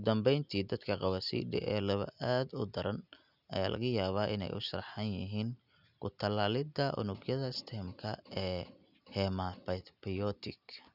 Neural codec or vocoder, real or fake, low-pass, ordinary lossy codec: none; real; 5.4 kHz; none